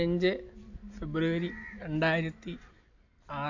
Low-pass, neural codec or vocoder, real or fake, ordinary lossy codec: 7.2 kHz; none; real; AAC, 48 kbps